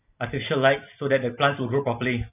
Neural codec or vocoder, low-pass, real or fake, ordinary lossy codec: none; 3.6 kHz; real; none